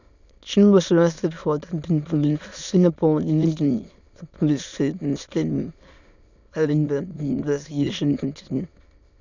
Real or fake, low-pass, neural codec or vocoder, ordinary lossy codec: fake; 7.2 kHz; autoencoder, 22.05 kHz, a latent of 192 numbers a frame, VITS, trained on many speakers; none